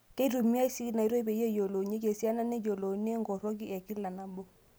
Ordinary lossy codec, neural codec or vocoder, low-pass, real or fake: none; none; none; real